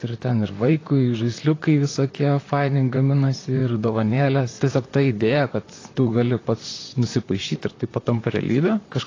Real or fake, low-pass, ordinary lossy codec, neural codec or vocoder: fake; 7.2 kHz; AAC, 32 kbps; vocoder, 44.1 kHz, 128 mel bands, Pupu-Vocoder